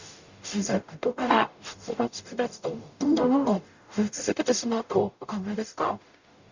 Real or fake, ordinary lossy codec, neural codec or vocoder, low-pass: fake; Opus, 64 kbps; codec, 44.1 kHz, 0.9 kbps, DAC; 7.2 kHz